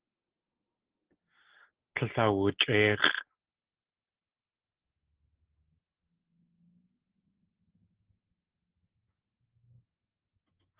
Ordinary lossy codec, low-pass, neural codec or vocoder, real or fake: Opus, 16 kbps; 3.6 kHz; none; real